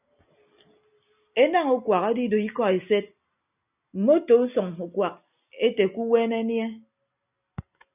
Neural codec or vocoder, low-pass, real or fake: none; 3.6 kHz; real